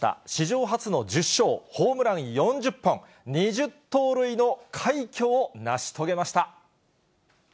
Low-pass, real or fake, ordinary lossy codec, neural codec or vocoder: none; real; none; none